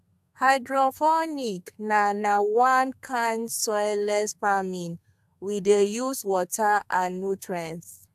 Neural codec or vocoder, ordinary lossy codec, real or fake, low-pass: codec, 32 kHz, 1.9 kbps, SNAC; AAC, 96 kbps; fake; 14.4 kHz